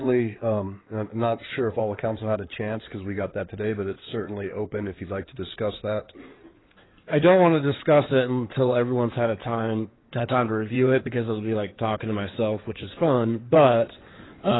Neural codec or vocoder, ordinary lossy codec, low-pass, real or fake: codec, 16 kHz in and 24 kHz out, 2.2 kbps, FireRedTTS-2 codec; AAC, 16 kbps; 7.2 kHz; fake